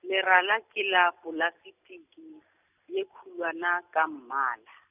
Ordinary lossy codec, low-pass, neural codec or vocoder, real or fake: none; 3.6 kHz; none; real